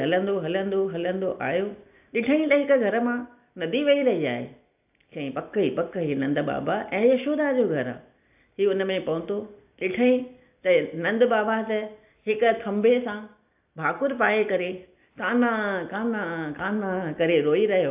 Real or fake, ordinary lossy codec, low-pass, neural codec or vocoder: real; AAC, 32 kbps; 3.6 kHz; none